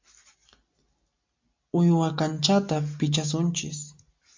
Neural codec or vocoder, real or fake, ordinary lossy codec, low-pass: none; real; MP3, 48 kbps; 7.2 kHz